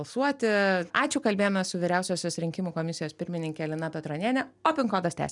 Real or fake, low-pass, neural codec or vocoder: real; 10.8 kHz; none